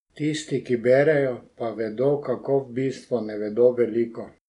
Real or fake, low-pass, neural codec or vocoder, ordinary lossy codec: real; 10.8 kHz; none; none